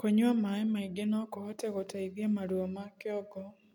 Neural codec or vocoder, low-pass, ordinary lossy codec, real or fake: none; 19.8 kHz; MP3, 96 kbps; real